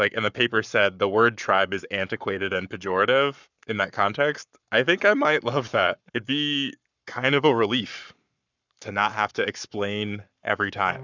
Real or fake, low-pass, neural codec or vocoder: fake; 7.2 kHz; codec, 44.1 kHz, 7.8 kbps, Pupu-Codec